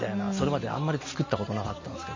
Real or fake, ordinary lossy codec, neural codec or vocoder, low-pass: real; MP3, 32 kbps; none; 7.2 kHz